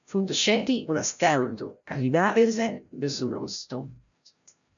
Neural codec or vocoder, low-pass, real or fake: codec, 16 kHz, 0.5 kbps, FreqCodec, larger model; 7.2 kHz; fake